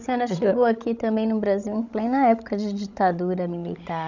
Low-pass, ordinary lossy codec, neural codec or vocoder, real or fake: 7.2 kHz; none; codec, 16 kHz, 16 kbps, FreqCodec, larger model; fake